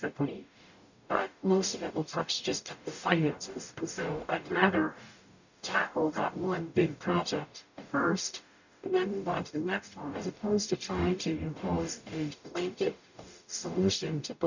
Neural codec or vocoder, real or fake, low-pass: codec, 44.1 kHz, 0.9 kbps, DAC; fake; 7.2 kHz